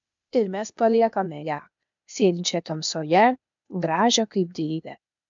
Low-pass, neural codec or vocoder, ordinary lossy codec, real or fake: 7.2 kHz; codec, 16 kHz, 0.8 kbps, ZipCodec; MP3, 64 kbps; fake